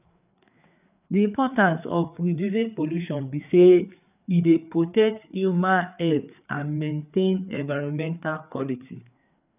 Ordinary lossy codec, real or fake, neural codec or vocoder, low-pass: none; fake; codec, 16 kHz, 4 kbps, FreqCodec, larger model; 3.6 kHz